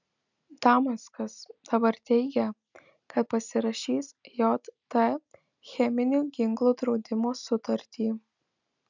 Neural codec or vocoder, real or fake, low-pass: none; real; 7.2 kHz